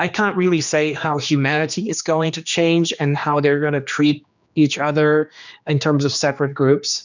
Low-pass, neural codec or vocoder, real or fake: 7.2 kHz; codec, 16 kHz, 2 kbps, X-Codec, HuBERT features, trained on general audio; fake